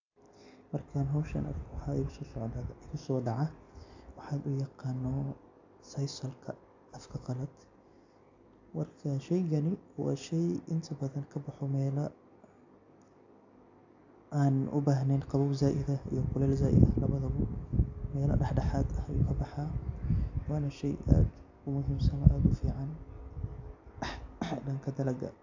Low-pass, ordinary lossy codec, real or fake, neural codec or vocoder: 7.2 kHz; AAC, 48 kbps; real; none